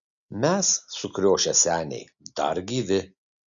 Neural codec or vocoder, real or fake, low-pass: none; real; 7.2 kHz